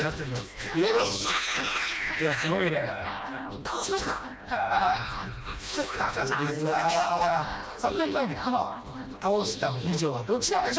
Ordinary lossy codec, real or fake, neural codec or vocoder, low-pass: none; fake; codec, 16 kHz, 1 kbps, FreqCodec, smaller model; none